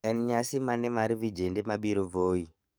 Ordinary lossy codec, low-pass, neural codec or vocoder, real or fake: none; none; codec, 44.1 kHz, 7.8 kbps, DAC; fake